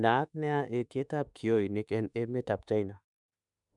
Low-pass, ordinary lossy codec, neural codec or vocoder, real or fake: 10.8 kHz; none; autoencoder, 48 kHz, 32 numbers a frame, DAC-VAE, trained on Japanese speech; fake